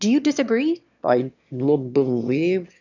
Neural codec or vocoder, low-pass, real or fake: autoencoder, 22.05 kHz, a latent of 192 numbers a frame, VITS, trained on one speaker; 7.2 kHz; fake